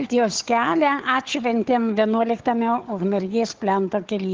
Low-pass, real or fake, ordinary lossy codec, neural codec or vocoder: 7.2 kHz; fake; Opus, 16 kbps; codec, 16 kHz, 4 kbps, FunCodec, trained on Chinese and English, 50 frames a second